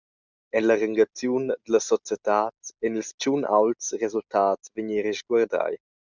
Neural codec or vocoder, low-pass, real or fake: none; 7.2 kHz; real